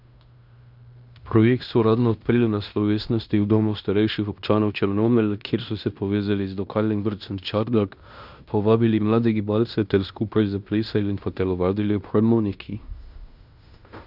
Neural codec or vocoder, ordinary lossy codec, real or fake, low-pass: codec, 16 kHz in and 24 kHz out, 0.9 kbps, LongCat-Audio-Codec, fine tuned four codebook decoder; none; fake; 5.4 kHz